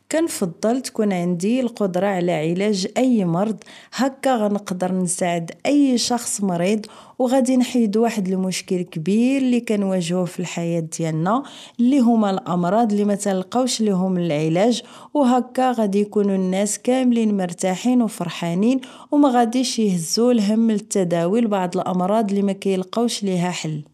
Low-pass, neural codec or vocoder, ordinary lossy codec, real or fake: 14.4 kHz; none; none; real